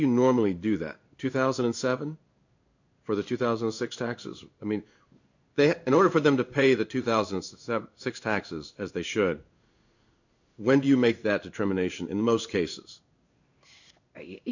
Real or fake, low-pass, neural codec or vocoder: fake; 7.2 kHz; codec, 16 kHz in and 24 kHz out, 1 kbps, XY-Tokenizer